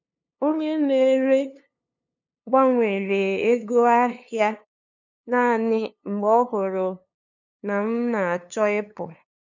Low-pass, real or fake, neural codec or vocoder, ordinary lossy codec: 7.2 kHz; fake; codec, 16 kHz, 2 kbps, FunCodec, trained on LibriTTS, 25 frames a second; none